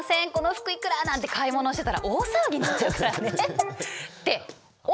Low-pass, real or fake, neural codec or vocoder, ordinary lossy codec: none; real; none; none